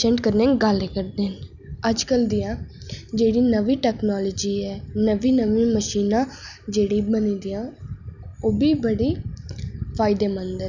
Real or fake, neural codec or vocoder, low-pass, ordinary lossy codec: real; none; 7.2 kHz; none